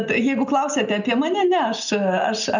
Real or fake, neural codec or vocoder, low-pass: real; none; 7.2 kHz